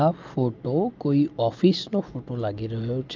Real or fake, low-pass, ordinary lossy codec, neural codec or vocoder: real; 7.2 kHz; Opus, 24 kbps; none